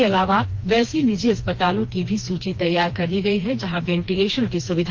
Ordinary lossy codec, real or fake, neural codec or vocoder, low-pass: Opus, 32 kbps; fake; codec, 16 kHz, 2 kbps, FreqCodec, smaller model; 7.2 kHz